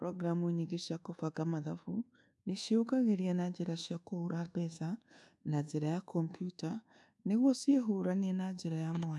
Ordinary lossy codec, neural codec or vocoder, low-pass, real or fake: none; codec, 24 kHz, 1.2 kbps, DualCodec; none; fake